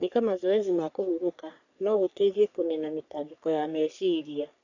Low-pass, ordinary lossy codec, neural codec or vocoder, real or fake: 7.2 kHz; none; codec, 44.1 kHz, 3.4 kbps, Pupu-Codec; fake